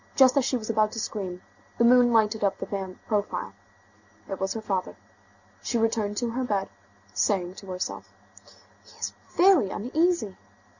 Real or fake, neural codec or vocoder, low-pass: real; none; 7.2 kHz